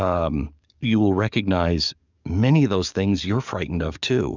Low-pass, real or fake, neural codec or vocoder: 7.2 kHz; fake; codec, 44.1 kHz, 7.8 kbps, DAC